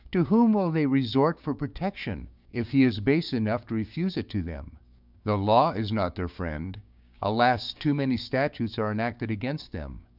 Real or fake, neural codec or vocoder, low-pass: fake; codec, 16 kHz, 6 kbps, DAC; 5.4 kHz